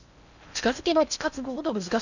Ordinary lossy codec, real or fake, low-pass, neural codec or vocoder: none; fake; 7.2 kHz; codec, 16 kHz in and 24 kHz out, 0.6 kbps, FocalCodec, streaming, 4096 codes